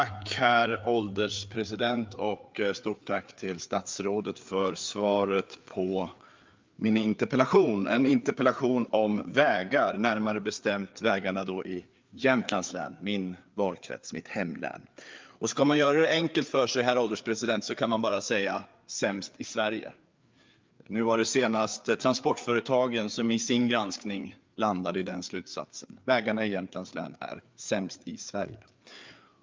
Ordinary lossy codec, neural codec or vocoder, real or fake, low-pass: Opus, 24 kbps; codec, 16 kHz, 4 kbps, FreqCodec, larger model; fake; 7.2 kHz